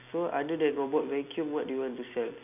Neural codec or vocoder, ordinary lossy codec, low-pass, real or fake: none; none; 3.6 kHz; real